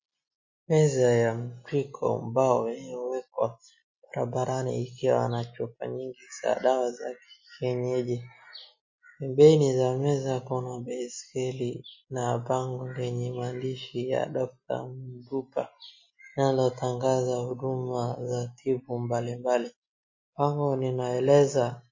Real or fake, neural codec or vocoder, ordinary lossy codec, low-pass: real; none; MP3, 32 kbps; 7.2 kHz